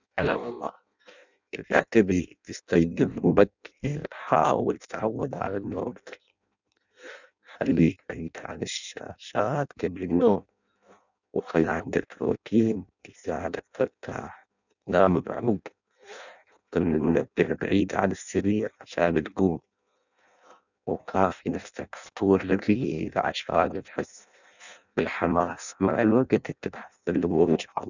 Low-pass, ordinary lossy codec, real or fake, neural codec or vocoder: 7.2 kHz; none; fake; codec, 16 kHz in and 24 kHz out, 0.6 kbps, FireRedTTS-2 codec